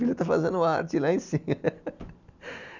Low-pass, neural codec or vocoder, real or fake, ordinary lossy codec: 7.2 kHz; none; real; none